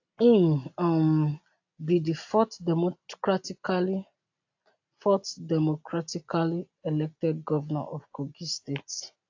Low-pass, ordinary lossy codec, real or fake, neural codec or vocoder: 7.2 kHz; AAC, 48 kbps; real; none